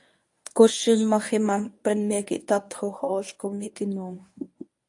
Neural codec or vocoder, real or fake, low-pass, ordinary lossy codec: codec, 24 kHz, 0.9 kbps, WavTokenizer, medium speech release version 1; fake; 10.8 kHz; AAC, 64 kbps